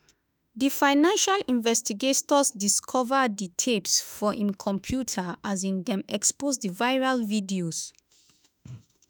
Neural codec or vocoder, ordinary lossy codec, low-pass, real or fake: autoencoder, 48 kHz, 32 numbers a frame, DAC-VAE, trained on Japanese speech; none; none; fake